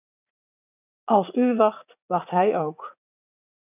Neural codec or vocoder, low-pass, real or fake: vocoder, 44.1 kHz, 80 mel bands, Vocos; 3.6 kHz; fake